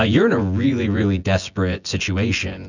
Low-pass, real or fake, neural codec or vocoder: 7.2 kHz; fake; vocoder, 24 kHz, 100 mel bands, Vocos